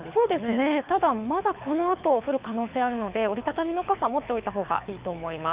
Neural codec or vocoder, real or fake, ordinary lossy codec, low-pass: codec, 24 kHz, 6 kbps, HILCodec; fake; Opus, 64 kbps; 3.6 kHz